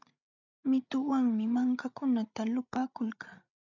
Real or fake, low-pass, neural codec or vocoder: fake; 7.2 kHz; codec, 16 kHz, 16 kbps, FreqCodec, larger model